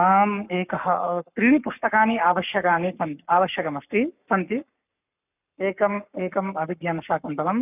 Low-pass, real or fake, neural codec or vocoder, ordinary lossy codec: 3.6 kHz; fake; codec, 44.1 kHz, 7.8 kbps, Pupu-Codec; none